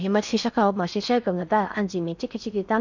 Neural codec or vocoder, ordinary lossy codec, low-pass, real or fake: codec, 16 kHz in and 24 kHz out, 0.6 kbps, FocalCodec, streaming, 4096 codes; none; 7.2 kHz; fake